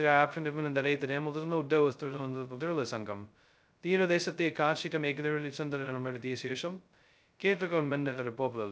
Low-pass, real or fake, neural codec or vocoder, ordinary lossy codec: none; fake; codec, 16 kHz, 0.2 kbps, FocalCodec; none